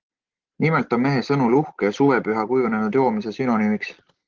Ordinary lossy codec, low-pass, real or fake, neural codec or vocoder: Opus, 16 kbps; 7.2 kHz; real; none